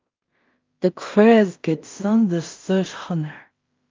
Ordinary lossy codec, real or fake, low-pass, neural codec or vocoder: Opus, 24 kbps; fake; 7.2 kHz; codec, 16 kHz in and 24 kHz out, 0.4 kbps, LongCat-Audio-Codec, two codebook decoder